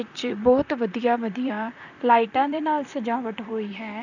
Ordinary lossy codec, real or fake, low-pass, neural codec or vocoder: none; fake; 7.2 kHz; vocoder, 44.1 kHz, 128 mel bands, Pupu-Vocoder